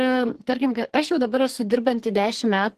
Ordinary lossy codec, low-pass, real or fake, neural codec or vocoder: Opus, 16 kbps; 14.4 kHz; fake; codec, 44.1 kHz, 2.6 kbps, SNAC